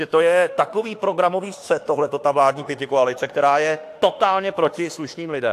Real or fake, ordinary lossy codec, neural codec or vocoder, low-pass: fake; AAC, 64 kbps; autoencoder, 48 kHz, 32 numbers a frame, DAC-VAE, trained on Japanese speech; 14.4 kHz